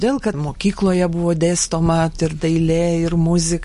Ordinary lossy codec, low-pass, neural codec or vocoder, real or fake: MP3, 48 kbps; 14.4 kHz; none; real